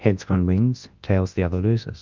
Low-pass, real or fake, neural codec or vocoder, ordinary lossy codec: 7.2 kHz; fake; codec, 24 kHz, 0.9 kbps, WavTokenizer, large speech release; Opus, 24 kbps